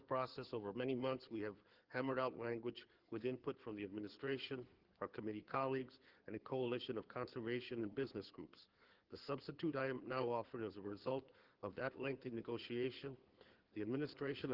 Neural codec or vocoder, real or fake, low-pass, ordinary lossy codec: codec, 16 kHz in and 24 kHz out, 2.2 kbps, FireRedTTS-2 codec; fake; 5.4 kHz; Opus, 16 kbps